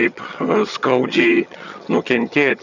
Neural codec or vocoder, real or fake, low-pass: vocoder, 22.05 kHz, 80 mel bands, HiFi-GAN; fake; 7.2 kHz